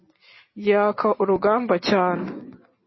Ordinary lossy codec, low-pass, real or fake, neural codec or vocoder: MP3, 24 kbps; 7.2 kHz; real; none